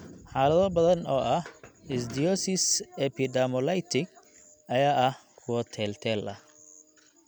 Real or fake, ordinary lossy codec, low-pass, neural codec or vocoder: real; none; none; none